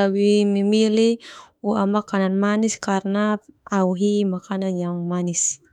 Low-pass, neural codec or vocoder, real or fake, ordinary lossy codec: 19.8 kHz; autoencoder, 48 kHz, 32 numbers a frame, DAC-VAE, trained on Japanese speech; fake; none